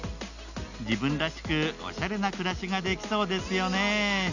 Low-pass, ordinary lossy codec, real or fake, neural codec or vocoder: 7.2 kHz; none; real; none